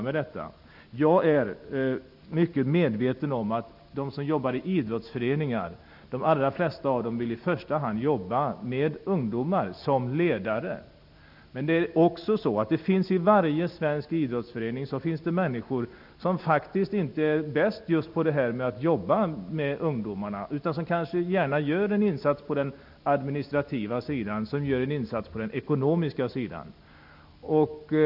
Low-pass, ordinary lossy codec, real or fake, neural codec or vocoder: 5.4 kHz; none; real; none